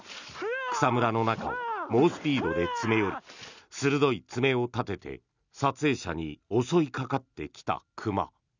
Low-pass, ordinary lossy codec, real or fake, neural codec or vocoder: 7.2 kHz; none; real; none